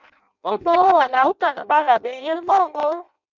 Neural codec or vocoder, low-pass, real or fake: codec, 16 kHz in and 24 kHz out, 0.6 kbps, FireRedTTS-2 codec; 7.2 kHz; fake